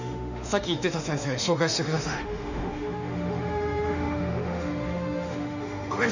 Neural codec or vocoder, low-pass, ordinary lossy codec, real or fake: autoencoder, 48 kHz, 32 numbers a frame, DAC-VAE, trained on Japanese speech; 7.2 kHz; none; fake